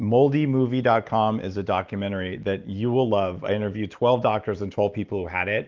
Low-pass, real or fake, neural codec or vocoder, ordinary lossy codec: 7.2 kHz; real; none; Opus, 32 kbps